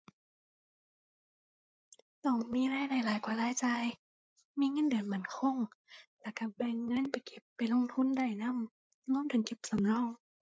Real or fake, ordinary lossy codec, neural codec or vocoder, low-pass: fake; none; codec, 16 kHz, 8 kbps, FreqCodec, larger model; none